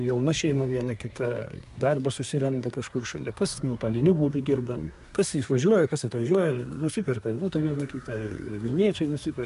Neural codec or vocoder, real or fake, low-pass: codec, 24 kHz, 1 kbps, SNAC; fake; 10.8 kHz